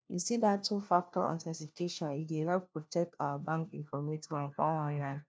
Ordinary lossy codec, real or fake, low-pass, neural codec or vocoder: none; fake; none; codec, 16 kHz, 1 kbps, FunCodec, trained on LibriTTS, 50 frames a second